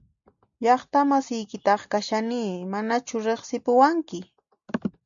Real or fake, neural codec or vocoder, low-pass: real; none; 7.2 kHz